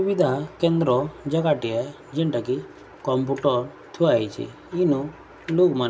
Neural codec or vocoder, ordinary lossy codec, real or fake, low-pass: none; none; real; none